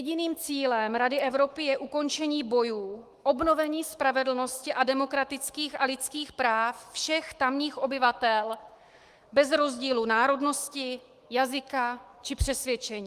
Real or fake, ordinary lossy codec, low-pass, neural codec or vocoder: fake; Opus, 24 kbps; 14.4 kHz; autoencoder, 48 kHz, 128 numbers a frame, DAC-VAE, trained on Japanese speech